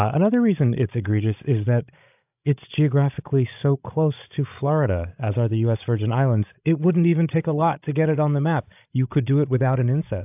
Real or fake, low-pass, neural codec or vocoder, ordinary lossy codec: fake; 3.6 kHz; codec, 16 kHz, 16 kbps, FreqCodec, larger model; AAC, 32 kbps